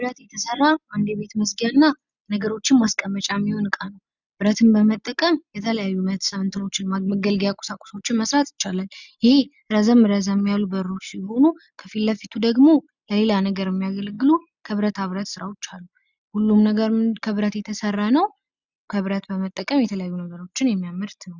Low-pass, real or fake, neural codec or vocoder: 7.2 kHz; real; none